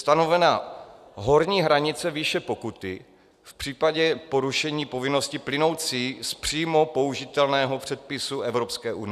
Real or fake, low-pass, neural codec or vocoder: real; 14.4 kHz; none